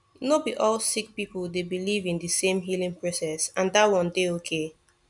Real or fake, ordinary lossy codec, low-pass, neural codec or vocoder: real; none; 10.8 kHz; none